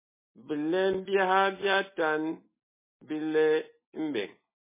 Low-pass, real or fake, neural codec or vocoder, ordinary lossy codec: 3.6 kHz; real; none; MP3, 16 kbps